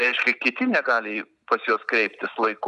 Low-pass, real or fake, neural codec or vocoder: 10.8 kHz; real; none